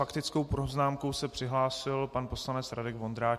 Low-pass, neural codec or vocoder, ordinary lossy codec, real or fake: 14.4 kHz; none; MP3, 64 kbps; real